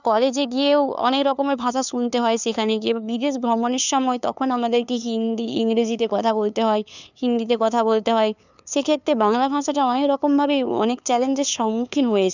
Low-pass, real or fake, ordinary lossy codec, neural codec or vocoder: 7.2 kHz; fake; none; codec, 16 kHz, 2 kbps, FunCodec, trained on LibriTTS, 25 frames a second